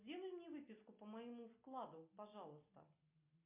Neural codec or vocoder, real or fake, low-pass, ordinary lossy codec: none; real; 3.6 kHz; MP3, 32 kbps